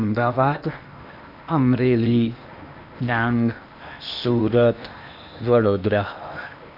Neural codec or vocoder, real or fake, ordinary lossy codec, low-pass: codec, 16 kHz in and 24 kHz out, 0.8 kbps, FocalCodec, streaming, 65536 codes; fake; none; 5.4 kHz